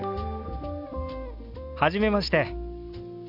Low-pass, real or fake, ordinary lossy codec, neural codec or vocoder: 5.4 kHz; real; none; none